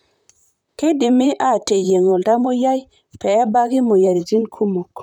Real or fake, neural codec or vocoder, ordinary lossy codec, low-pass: fake; vocoder, 44.1 kHz, 128 mel bands, Pupu-Vocoder; none; 19.8 kHz